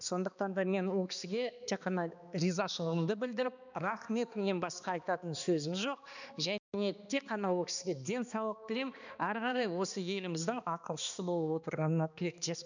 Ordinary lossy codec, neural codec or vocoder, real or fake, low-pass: none; codec, 16 kHz, 2 kbps, X-Codec, HuBERT features, trained on balanced general audio; fake; 7.2 kHz